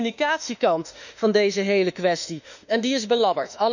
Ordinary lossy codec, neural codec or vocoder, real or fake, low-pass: none; autoencoder, 48 kHz, 32 numbers a frame, DAC-VAE, trained on Japanese speech; fake; 7.2 kHz